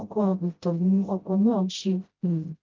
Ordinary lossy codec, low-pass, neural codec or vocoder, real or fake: Opus, 24 kbps; 7.2 kHz; codec, 16 kHz, 0.5 kbps, FreqCodec, smaller model; fake